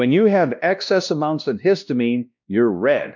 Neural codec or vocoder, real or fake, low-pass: codec, 16 kHz, 1 kbps, X-Codec, WavLM features, trained on Multilingual LibriSpeech; fake; 7.2 kHz